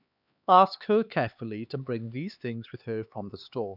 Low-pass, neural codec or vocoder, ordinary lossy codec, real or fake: 5.4 kHz; codec, 16 kHz, 4 kbps, X-Codec, HuBERT features, trained on LibriSpeech; none; fake